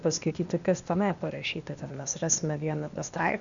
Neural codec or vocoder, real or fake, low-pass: codec, 16 kHz, 0.8 kbps, ZipCodec; fake; 7.2 kHz